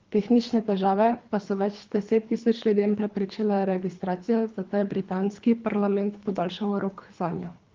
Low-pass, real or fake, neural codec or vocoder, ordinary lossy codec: 7.2 kHz; fake; codec, 24 kHz, 3 kbps, HILCodec; Opus, 32 kbps